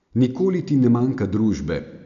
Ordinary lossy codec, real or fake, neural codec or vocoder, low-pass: none; real; none; 7.2 kHz